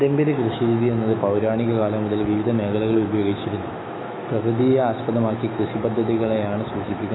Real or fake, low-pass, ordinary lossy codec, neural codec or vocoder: fake; 7.2 kHz; AAC, 16 kbps; autoencoder, 48 kHz, 128 numbers a frame, DAC-VAE, trained on Japanese speech